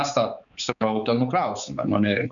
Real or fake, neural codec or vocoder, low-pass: fake; codec, 16 kHz, 4 kbps, X-Codec, HuBERT features, trained on balanced general audio; 7.2 kHz